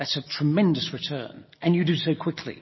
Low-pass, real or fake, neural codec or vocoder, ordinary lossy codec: 7.2 kHz; real; none; MP3, 24 kbps